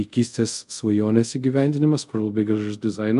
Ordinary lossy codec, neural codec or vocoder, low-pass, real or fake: MP3, 64 kbps; codec, 24 kHz, 0.5 kbps, DualCodec; 10.8 kHz; fake